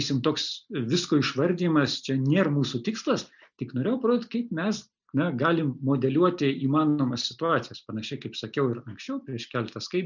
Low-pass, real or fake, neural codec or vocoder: 7.2 kHz; real; none